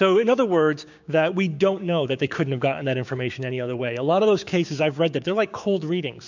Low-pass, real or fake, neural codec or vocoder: 7.2 kHz; fake; codec, 16 kHz, 6 kbps, DAC